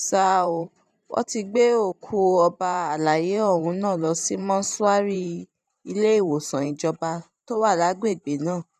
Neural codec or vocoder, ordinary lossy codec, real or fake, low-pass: vocoder, 44.1 kHz, 128 mel bands every 512 samples, BigVGAN v2; none; fake; 14.4 kHz